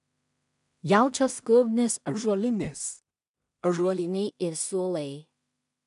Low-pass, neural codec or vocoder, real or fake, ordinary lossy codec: 10.8 kHz; codec, 16 kHz in and 24 kHz out, 0.4 kbps, LongCat-Audio-Codec, two codebook decoder; fake; MP3, 96 kbps